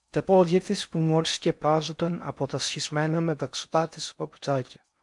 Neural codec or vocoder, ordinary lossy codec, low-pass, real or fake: codec, 16 kHz in and 24 kHz out, 0.6 kbps, FocalCodec, streaming, 4096 codes; MP3, 64 kbps; 10.8 kHz; fake